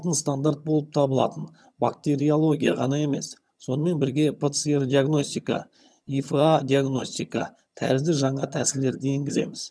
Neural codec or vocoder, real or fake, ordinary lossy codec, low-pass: vocoder, 22.05 kHz, 80 mel bands, HiFi-GAN; fake; none; none